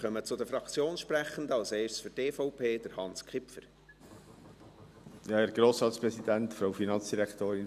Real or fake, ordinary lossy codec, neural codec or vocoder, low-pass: real; none; none; 14.4 kHz